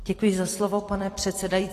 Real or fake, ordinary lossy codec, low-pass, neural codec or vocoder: fake; AAC, 48 kbps; 14.4 kHz; vocoder, 44.1 kHz, 128 mel bands every 256 samples, BigVGAN v2